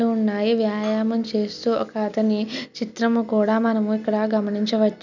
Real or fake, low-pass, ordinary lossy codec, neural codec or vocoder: real; 7.2 kHz; none; none